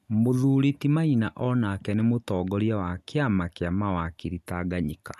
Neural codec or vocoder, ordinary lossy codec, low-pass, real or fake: none; none; 14.4 kHz; real